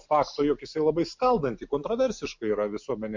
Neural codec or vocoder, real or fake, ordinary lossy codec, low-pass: none; real; MP3, 48 kbps; 7.2 kHz